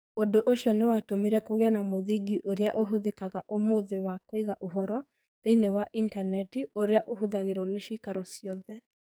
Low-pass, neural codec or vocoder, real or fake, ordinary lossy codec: none; codec, 44.1 kHz, 2.6 kbps, SNAC; fake; none